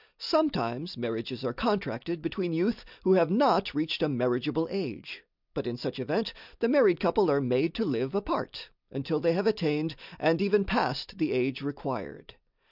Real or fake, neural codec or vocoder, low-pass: real; none; 5.4 kHz